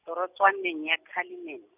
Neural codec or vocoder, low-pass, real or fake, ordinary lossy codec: none; 3.6 kHz; real; none